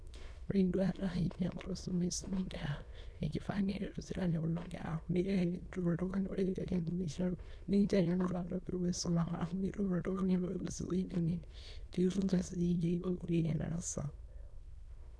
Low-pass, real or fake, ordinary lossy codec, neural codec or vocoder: none; fake; none; autoencoder, 22.05 kHz, a latent of 192 numbers a frame, VITS, trained on many speakers